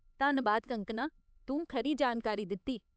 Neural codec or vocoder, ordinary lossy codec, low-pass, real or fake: codec, 16 kHz, 4 kbps, X-Codec, HuBERT features, trained on LibriSpeech; none; none; fake